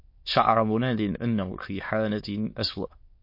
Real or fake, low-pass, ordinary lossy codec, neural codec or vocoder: fake; 5.4 kHz; MP3, 32 kbps; autoencoder, 22.05 kHz, a latent of 192 numbers a frame, VITS, trained on many speakers